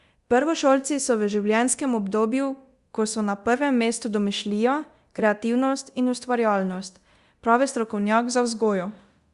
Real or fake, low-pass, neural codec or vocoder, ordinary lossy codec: fake; 10.8 kHz; codec, 24 kHz, 0.9 kbps, DualCodec; Opus, 64 kbps